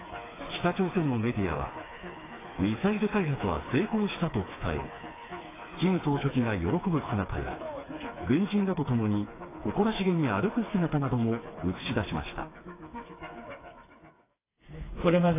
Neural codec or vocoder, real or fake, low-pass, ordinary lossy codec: codec, 16 kHz, 4 kbps, FreqCodec, smaller model; fake; 3.6 kHz; AAC, 16 kbps